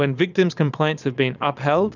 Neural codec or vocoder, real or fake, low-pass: vocoder, 22.05 kHz, 80 mel bands, Vocos; fake; 7.2 kHz